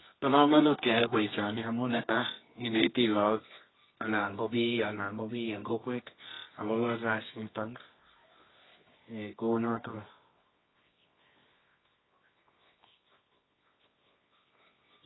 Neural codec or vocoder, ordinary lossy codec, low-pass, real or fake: codec, 24 kHz, 0.9 kbps, WavTokenizer, medium music audio release; AAC, 16 kbps; 7.2 kHz; fake